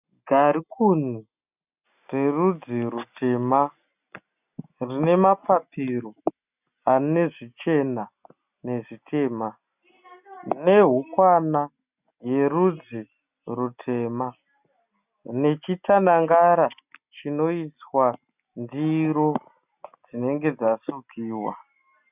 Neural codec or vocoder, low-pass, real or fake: none; 3.6 kHz; real